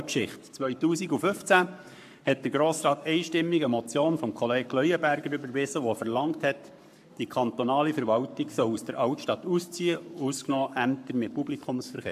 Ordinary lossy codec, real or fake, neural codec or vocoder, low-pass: AAC, 96 kbps; fake; codec, 44.1 kHz, 7.8 kbps, Pupu-Codec; 14.4 kHz